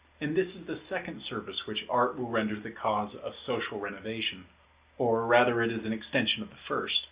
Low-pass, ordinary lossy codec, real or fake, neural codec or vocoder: 3.6 kHz; Opus, 64 kbps; real; none